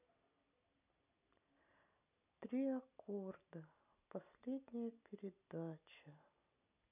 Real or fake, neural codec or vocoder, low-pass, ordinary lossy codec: real; none; 3.6 kHz; none